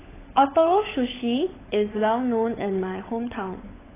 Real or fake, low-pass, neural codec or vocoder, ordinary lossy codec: fake; 3.6 kHz; codec, 16 kHz, 16 kbps, FunCodec, trained on LibriTTS, 50 frames a second; AAC, 16 kbps